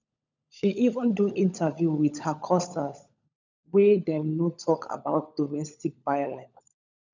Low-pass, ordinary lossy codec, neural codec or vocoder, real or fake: 7.2 kHz; none; codec, 16 kHz, 16 kbps, FunCodec, trained on LibriTTS, 50 frames a second; fake